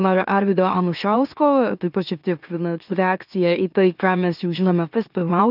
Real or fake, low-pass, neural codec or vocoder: fake; 5.4 kHz; autoencoder, 44.1 kHz, a latent of 192 numbers a frame, MeloTTS